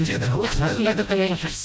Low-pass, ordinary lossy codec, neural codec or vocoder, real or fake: none; none; codec, 16 kHz, 0.5 kbps, FreqCodec, smaller model; fake